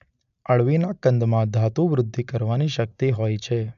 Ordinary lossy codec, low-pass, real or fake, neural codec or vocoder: none; 7.2 kHz; real; none